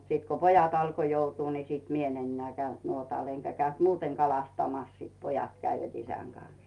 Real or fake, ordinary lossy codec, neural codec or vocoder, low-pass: real; Opus, 32 kbps; none; 10.8 kHz